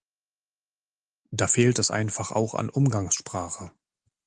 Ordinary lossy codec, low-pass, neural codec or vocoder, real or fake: Opus, 24 kbps; 10.8 kHz; none; real